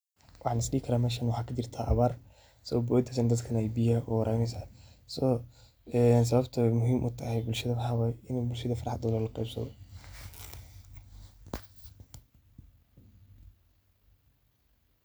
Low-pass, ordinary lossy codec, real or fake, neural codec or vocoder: none; none; real; none